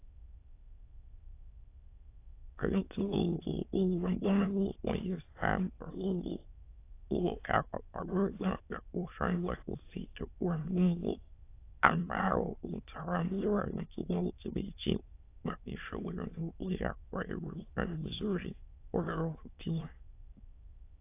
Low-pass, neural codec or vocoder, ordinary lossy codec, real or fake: 3.6 kHz; autoencoder, 22.05 kHz, a latent of 192 numbers a frame, VITS, trained on many speakers; AAC, 24 kbps; fake